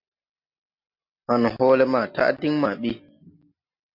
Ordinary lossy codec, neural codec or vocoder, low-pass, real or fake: MP3, 48 kbps; none; 5.4 kHz; real